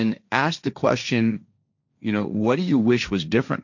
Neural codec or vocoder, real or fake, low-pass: codec, 16 kHz, 1.1 kbps, Voila-Tokenizer; fake; 7.2 kHz